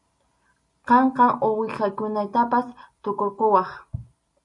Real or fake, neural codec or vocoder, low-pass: real; none; 10.8 kHz